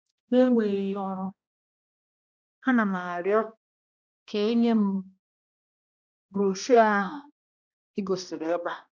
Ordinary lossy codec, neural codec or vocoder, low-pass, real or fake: none; codec, 16 kHz, 1 kbps, X-Codec, HuBERT features, trained on balanced general audio; none; fake